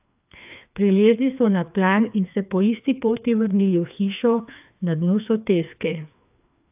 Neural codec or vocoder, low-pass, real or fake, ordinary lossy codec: codec, 16 kHz, 2 kbps, FreqCodec, larger model; 3.6 kHz; fake; AAC, 32 kbps